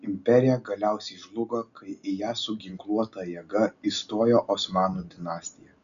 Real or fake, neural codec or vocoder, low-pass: real; none; 7.2 kHz